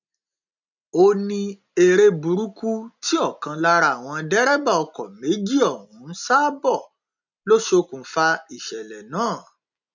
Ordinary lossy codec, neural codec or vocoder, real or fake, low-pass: none; none; real; 7.2 kHz